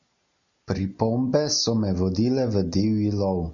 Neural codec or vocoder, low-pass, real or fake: none; 7.2 kHz; real